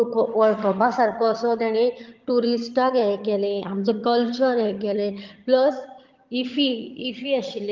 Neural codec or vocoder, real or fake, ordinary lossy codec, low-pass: vocoder, 22.05 kHz, 80 mel bands, HiFi-GAN; fake; Opus, 32 kbps; 7.2 kHz